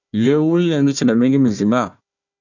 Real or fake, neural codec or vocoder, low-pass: fake; codec, 16 kHz, 1 kbps, FunCodec, trained on Chinese and English, 50 frames a second; 7.2 kHz